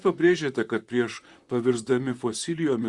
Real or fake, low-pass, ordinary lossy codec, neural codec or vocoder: fake; 10.8 kHz; Opus, 64 kbps; vocoder, 44.1 kHz, 128 mel bands, Pupu-Vocoder